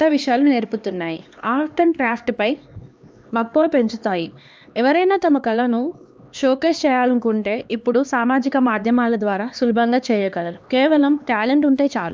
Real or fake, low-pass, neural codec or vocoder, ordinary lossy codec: fake; none; codec, 16 kHz, 2 kbps, X-Codec, HuBERT features, trained on LibriSpeech; none